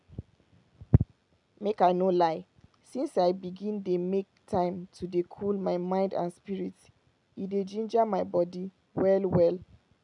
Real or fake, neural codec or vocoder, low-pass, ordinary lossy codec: real; none; 10.8 kHz; none